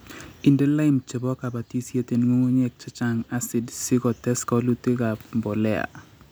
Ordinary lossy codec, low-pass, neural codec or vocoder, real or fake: none; none; none; real